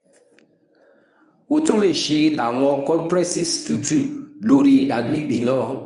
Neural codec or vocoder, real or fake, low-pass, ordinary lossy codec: codec, 24 kHz, 0.9 kbps, WavTokenizer, medium speech release version 1; fake; 10.8 kHz; none